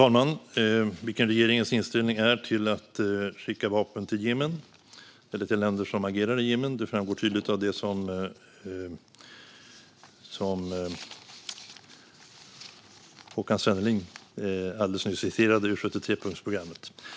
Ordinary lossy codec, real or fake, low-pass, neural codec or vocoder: none; real; none; none